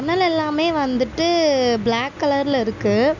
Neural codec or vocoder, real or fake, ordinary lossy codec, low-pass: none; real; none; 7.2 kHz